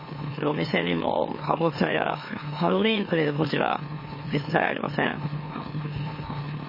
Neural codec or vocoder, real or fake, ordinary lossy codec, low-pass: autoencoder, 44.1 kHz, a latent of 192 numbers a frame, MeloTTS; fake; MP3, 24 kbps; 5.4 kHz